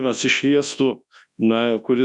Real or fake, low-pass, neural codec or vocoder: fake; 10.8 kHz; codec, 24 kHz, 0.9 kbps, WavTokenizer, large speech release